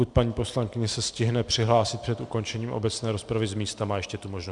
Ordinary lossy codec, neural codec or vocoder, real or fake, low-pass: Opus, 64 kbps; none; real; 10.8 kHz